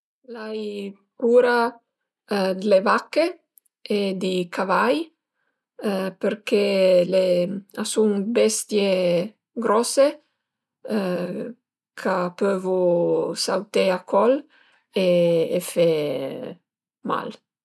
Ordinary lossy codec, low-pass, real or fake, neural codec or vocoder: none; none; real; none